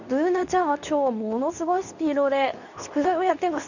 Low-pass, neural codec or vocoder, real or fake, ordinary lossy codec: 7.2 kHz; codec, 24 kHz, 0.9 kbps, WavTokenizer, medium speech release version 2; fake; none